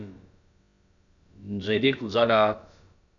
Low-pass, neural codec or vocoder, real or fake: 7.2 kHz; codec, 16 kHz, about 1 kbps, DyCAST, with the encoder's durations; fake